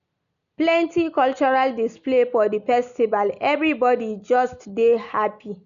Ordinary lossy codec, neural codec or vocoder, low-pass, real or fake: none; none; 7.2 kHz; real